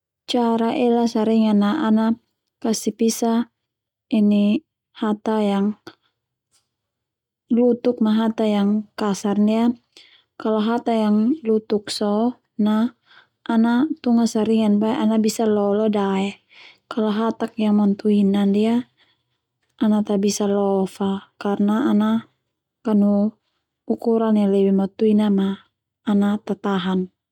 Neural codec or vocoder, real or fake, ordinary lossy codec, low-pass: none; real; none; 19.8 kHz